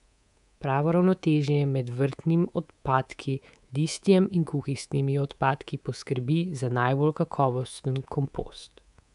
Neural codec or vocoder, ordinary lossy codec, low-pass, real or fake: codec, 24 kHz, 3.1 kbps, DualCodec; none; 10.8 kHz; fake